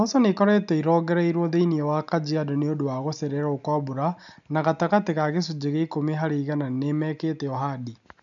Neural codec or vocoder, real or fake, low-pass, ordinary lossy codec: none; real; 7.2 kHz; none